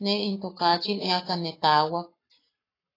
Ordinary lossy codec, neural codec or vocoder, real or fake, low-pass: AAC, 24 kbps; codec, 44.1 kHz, 7.8 kbps, Pupu-Codec; fake; 5.4 kHz